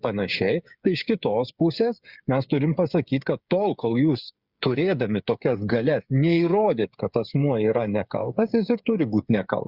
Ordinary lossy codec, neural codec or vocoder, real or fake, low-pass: Opus, 64 kbps; codec, 16 kHz, 8 kbps, FreqCodec, smaller model; fake; 5.4 kHz